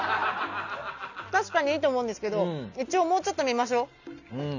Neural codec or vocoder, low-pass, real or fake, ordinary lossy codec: none; 7.2 kHz; real; none